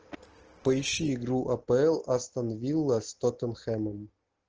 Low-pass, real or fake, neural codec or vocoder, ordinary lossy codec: 7.2 kHz; real; none; Opus, 16 kbps